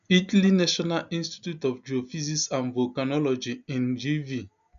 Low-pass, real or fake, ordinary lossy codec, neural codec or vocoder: 7.2 kHz; real; none; none